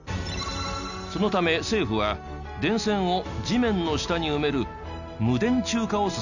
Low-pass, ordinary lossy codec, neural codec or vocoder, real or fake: 7.2 kHz; none; none; real